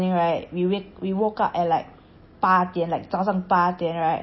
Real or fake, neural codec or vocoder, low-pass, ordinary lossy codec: real; none; 7.2 kHz; MP3, 24 kbps